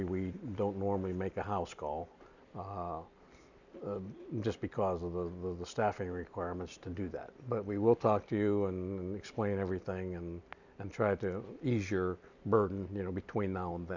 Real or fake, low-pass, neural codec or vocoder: real; 7.2 kHz; none